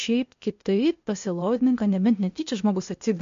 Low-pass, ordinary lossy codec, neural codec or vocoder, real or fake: 7.2 kHz; MP3, 48 kbps; codec, 16 kHz, 0.8 kbps, ZipCodec; fake